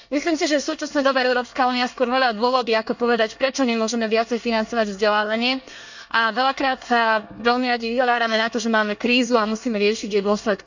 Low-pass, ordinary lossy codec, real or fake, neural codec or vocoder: 7.2 kHz; none; fake; codec, 24 kHz, 1 kbps, SNAC